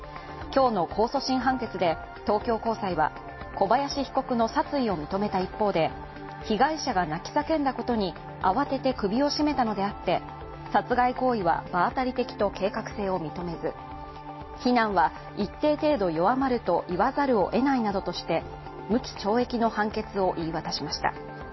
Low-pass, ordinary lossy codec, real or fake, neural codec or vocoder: 7.2 kHz; MP3, 24 kbps; real; none